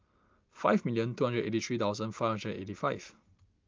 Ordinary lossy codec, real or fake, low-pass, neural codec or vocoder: Opus, 24 kbps; real; 7.2 kHz; none